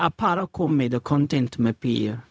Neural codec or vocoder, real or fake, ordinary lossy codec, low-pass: codec, 16 kHz, 0.4 kbps, LongCat-Audio-Codec; fake; none; none